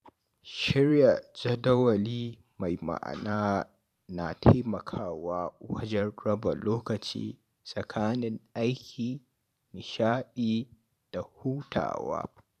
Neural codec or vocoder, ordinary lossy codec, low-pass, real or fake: vocoder, 44.1 kHz, 128 mel bands every 512 samples, BigVGAN v2; none; 14.4 kHz; fake